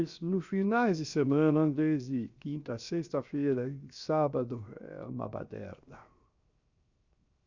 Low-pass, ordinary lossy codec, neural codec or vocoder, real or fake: 7.2 kHz; Opus, 64 kbps; codec, 16 kHz, 0.7 kbps, FocalCodec; fake